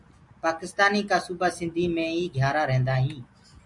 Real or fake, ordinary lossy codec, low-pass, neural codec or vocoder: real; MP3, 96 kbps; 10.8 kHz; none